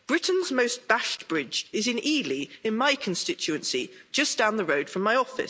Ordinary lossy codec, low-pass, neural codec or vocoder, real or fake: none; none; none; real